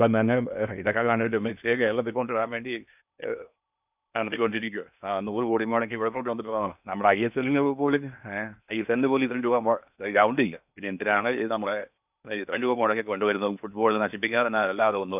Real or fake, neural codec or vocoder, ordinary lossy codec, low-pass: fake; codec, 16 kHz in and 24 kHz out, 0.8 kbps, FocalCodec, streaming, 65536 codes; none; 3.6 kHz